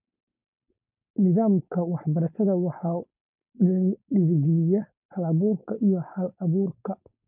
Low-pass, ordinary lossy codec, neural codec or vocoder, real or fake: 3.6 kHz; none; codec, 16 kHz, 4.8 kbps, FACodec; fake